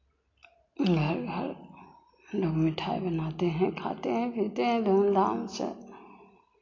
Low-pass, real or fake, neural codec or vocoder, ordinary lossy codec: 7.2 kHz; real; none; AAC, 32 kbps